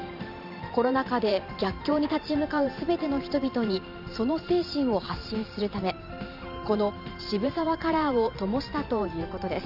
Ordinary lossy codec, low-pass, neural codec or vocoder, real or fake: none; 5.4 kHz; vocoder, 44.1 kHz, 128 mel bands every 512 samples, BigVGAN v2; fake